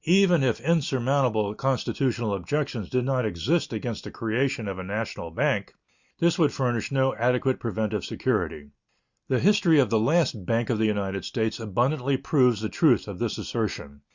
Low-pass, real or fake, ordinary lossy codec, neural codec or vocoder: 7.2 kHz; real; Opus, 64 kbps; none